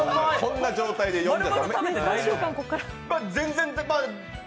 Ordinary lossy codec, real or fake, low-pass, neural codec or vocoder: none; real; none; none